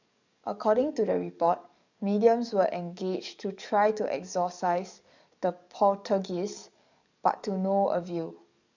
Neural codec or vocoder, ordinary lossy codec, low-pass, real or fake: codec, 44.1 kHz, 7.8 kbps, DAC; none; 7.2 kHz; fake